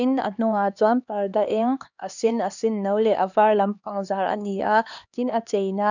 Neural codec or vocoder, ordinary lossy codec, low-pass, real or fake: codec, 16 kHz, 2 kbps, X-Codec, HuBERT features, trained on LibriSpeech; none; 7.2 kHz; fake